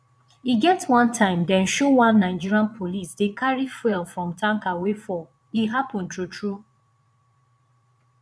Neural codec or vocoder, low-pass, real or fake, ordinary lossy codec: vocoder, 22.05 kHz, 80 mel bands, Vocos; none; fake; none